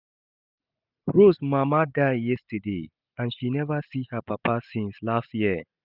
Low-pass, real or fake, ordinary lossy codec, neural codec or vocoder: 5.4 kHz; real; none; none